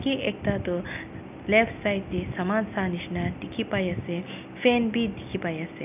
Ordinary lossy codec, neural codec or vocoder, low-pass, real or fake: none; none; 3.6 kHz; real